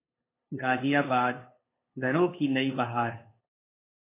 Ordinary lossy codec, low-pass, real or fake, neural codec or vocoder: AAC, 24 kbps; 3.6 kHz; fake; codec, 16 kHz, 2 kbps, FunCodec, trained on LibriTTS, 25 frames a second